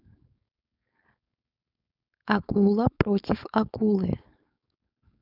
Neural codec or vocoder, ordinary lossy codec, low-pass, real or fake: codec, 16 kHz, 4.8 kbps, FACodec; none; 5.4 kHz; fake